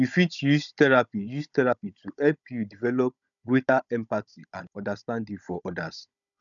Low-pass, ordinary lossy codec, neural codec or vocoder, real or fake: 7.2 kHz; none; codec, 16 kHz, 16 kbps, FunCodec, trained on Chinese and English, 50 frames a second; fake